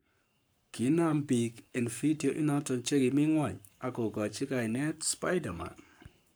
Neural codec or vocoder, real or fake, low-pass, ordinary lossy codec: codec, 44.1 kHz, 7.8 kbps, Pupu-Codec; fake; none; none